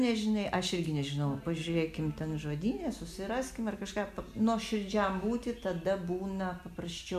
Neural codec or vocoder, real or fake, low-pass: none; real; 14.4 kHz